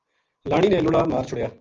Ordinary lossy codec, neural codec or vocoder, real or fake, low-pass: Opus, 32 kbps; none; real; 7.2 kHz